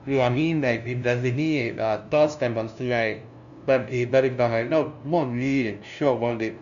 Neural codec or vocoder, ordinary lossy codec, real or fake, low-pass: codec, 16 kHz, 0.5 kbps, FunCodec, trained on LibriTTS, 25 frames a second; none; fake; 7.2 kHz